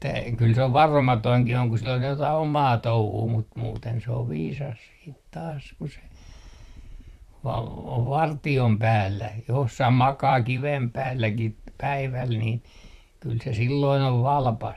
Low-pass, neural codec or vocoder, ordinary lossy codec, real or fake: 14.4 kHz; vocoder, 44.1 kHz, 128 mel bands, Pupu-Vocoder; none; fake